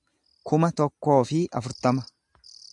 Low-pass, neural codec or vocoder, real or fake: 10.8 kHz; none; real